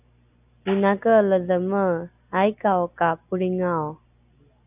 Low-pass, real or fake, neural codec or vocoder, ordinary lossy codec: 3.6 kHz; real; none; AAC, 32 kbps